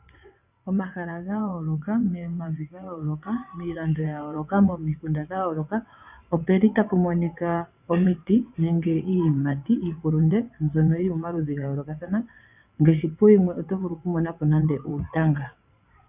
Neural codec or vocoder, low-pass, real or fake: vocoder, 22.05 kHz, 80 mel bands, WaveNeXt; 3.6 kHz; fake